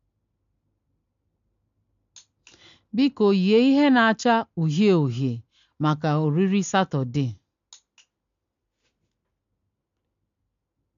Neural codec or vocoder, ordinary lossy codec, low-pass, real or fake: none; MP3, 64 kbps; 7.2 kHz; real